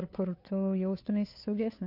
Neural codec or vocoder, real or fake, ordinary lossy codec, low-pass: codec, 16 kHz, 2 kbps, FunCodec, trained on Chinese and English, 25 frames a second; fake; AAC, 48 kbps; 5.4 kHz